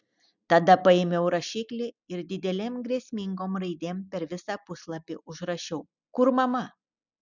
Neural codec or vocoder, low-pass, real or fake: none; 7.2 kHz; real